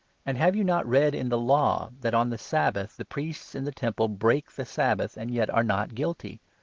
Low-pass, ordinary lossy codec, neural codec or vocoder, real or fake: 7.2 kHz; Opus, 16 kbps; none; real